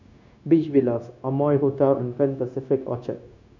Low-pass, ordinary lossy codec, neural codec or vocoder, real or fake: 7.2 kHz; none; codec, 16 kHz, 0.9 kbps, LongCat-Audio-Codec; fake